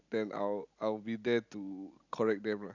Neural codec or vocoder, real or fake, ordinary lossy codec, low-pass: none; real; none; 7.2 kHz